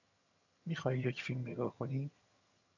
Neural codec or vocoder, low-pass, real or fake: vocoder, 22.05 kHz, 80 mel bands, HiFi-GAN; 7.2 kHz; fake